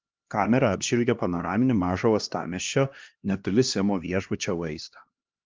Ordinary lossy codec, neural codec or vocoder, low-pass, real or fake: Opus, 24 kbps; codec, 16 kHz, 1 kbps, X-Codec, HuBERT features, trained on LibriSpeech; 7.2 kHz; fake